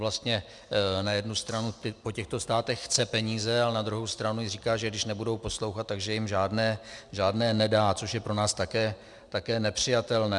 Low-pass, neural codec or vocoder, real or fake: 10.8 kHz; none; real